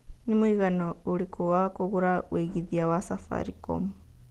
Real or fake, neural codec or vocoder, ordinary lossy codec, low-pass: real; none; Opus, 16 kbps; 14.4 kHz